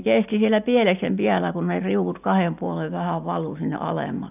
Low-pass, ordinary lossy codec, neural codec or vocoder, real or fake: 3.6 kHz; none; none; real